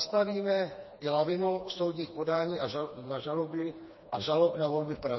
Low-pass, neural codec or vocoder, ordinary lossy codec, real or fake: 7.2 kHz; codec, 16 kHz, 2 kbps, FreqCodec, smaller model; MP3, 24 kbps; fake